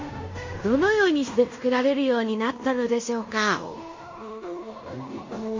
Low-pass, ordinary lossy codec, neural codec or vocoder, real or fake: 7.2 kHz; MP3, 32 kbps; codec, 16 kHz in and 24 kHz out, 0.9 kbps, LongCat-Audio-Codec, fine tuned four codebook decoder; fake